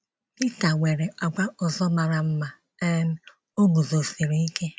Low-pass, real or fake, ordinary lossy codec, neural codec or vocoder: none; real; none; none